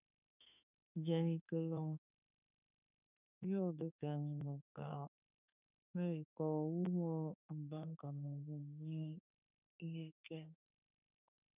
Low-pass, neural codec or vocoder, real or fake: 3.6 kHz; autoencoder, 48 kHz, 32 numbers a frame, DAC-VAE, trained on Japanese speech; fake